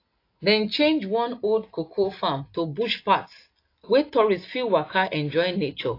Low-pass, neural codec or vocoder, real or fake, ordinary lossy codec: 5.4 kHz; none; real; AAC, 32 kbps